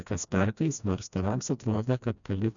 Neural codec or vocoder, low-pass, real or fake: codec, 16 kHz, 1 kbps, FreqCodec, smaller model; 7.2 kHz; fake